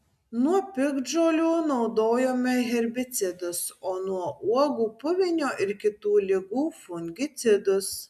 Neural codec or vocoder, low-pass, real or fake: none; 14.4 kHz; real